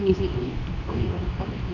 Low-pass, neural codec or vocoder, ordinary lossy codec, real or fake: 7.2 kHz; codec, 24 kHz, 0.9 kbps, WavTokenizer, medium speech release version 2; none; fake